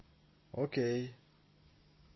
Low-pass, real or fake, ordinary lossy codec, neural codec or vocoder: 7.2 kHz; real; MP3, 24 kbps; none